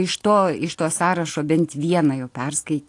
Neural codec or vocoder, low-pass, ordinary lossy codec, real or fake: none; 10.8 kHz; AAC, 48 kbps; real